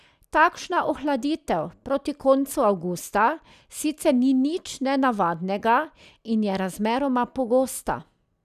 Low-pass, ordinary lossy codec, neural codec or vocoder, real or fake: 14.4 kHz; none; none; real